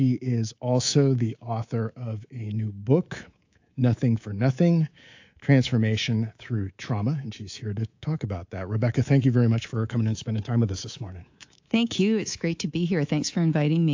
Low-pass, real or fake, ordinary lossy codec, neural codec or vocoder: 7.2 kHz; fake; AAC, 48 kbps; codec, 24 kHz, 3.1 kbps, DualCodec